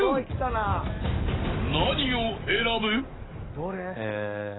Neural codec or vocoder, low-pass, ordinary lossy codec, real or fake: codec, 44.1 kHz, 7.8 kbps, DAC; 7.2 kHz; AAC, 16 kbps; fake